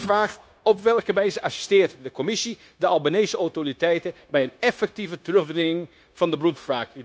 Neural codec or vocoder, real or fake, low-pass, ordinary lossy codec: codec, 16 kHz, 0.9 kbps, LongCat-Audio-Codec; fake; none; none